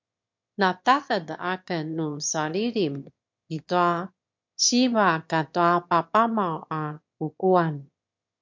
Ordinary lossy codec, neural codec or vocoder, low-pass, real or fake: MP3, 48 kbps; autoencoder, 22.05 kHz, a latent of 192 numbers a frame, VITS, trained on one speaker; 7.2 kHz; fake